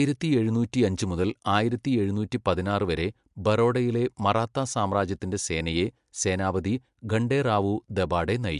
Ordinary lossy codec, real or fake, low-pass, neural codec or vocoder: MP3, 64 kbps; real; 10.8 kHz; none